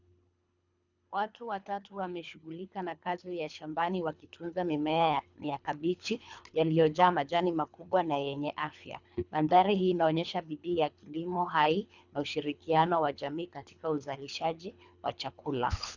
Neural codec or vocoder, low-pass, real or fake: codec, 24 kHz, 3 kbps, HILCodec; 7.2 kHz; fake